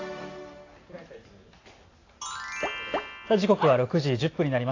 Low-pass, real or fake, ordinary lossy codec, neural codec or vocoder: 7.2 kHz; real; AAC, 32 kbps; none